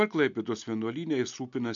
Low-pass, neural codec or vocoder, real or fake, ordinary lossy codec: 7.2 kHz; none; real; MP3, 64 kbps